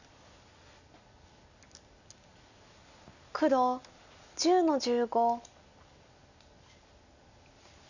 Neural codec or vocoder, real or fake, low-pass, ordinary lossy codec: none; real; 7.2 kHz; none